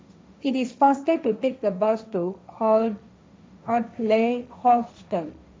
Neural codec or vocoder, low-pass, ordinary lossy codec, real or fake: codec, 16 kHz, 1.1 kbps, Voila-Tokenizer; none; none; fake